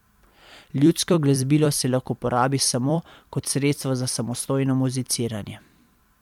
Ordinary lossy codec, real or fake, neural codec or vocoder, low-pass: MP3, 96 kbps; fake; vocoder, 44.1 kHz, 128 mel bands every 256 samples, BigVGAN v2; 19.8 kHz